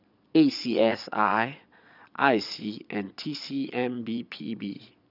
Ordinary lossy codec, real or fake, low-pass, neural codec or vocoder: none; fake; 5.4 kHz; vocoder, 22.05 kHz, 80 mel bands, Vocos